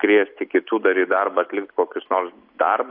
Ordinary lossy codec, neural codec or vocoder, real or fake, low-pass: AAC, 32 kbps; none; real; 5.4 kHz